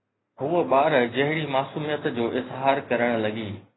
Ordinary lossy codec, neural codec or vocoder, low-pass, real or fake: AAC, 16 kbps; none; 7.2 kHz; real